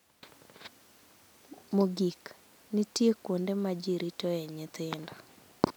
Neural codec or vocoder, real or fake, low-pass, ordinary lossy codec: none; real; none; none